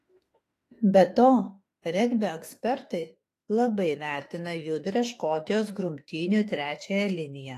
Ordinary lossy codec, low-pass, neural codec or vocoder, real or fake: AAC, 48 kbps; 14.4 kHz; autoencoder, 48 kHz, 32 numbers a frame, DAC-VAE, trained on Japanese speech; fake